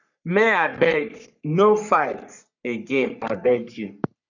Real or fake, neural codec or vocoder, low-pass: fake; codec, 44.1 kHz, 3.4 kbps, Pupu-Codec; 7.2 kHz